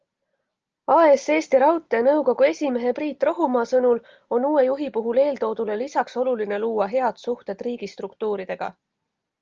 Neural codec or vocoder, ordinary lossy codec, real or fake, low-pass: none; Opus, 24 kbps; real; 7.2 kHz